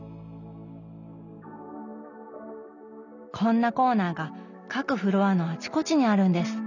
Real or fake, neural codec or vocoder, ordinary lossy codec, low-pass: real; none; none; 7.2 kHz